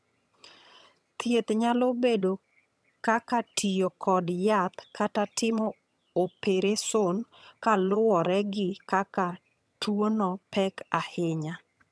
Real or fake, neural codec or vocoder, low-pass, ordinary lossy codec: fake; vocoder, 22.05 kHz, 80 mel bands, HiFi-GAN; none; none